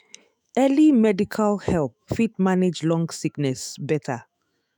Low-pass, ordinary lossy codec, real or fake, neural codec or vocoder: none; none; fake; autoencoder, 48 kHz, 128 numbers a frame, DAC-VAE, trained on Japanese speech